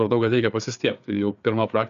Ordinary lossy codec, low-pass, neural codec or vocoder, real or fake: AAC, 96 kbps; 7.2 kHz; codec, 16 kHz, 4 kbps, FreqCodec, larger model; fake